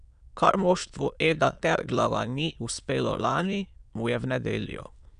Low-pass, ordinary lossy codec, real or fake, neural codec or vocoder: 9.9 kHz; none; fake; autoencoder, 22.05 kHz, a latent of 192 numbers a frame, VITS, trained on many speakers